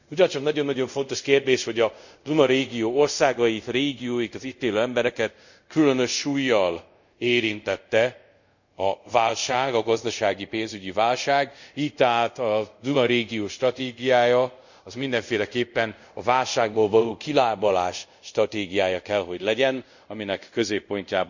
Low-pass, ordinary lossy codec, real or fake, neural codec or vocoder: 7.2 kHz; none; fake; codec, 24 kHz, 0.5 kbps, DualCodec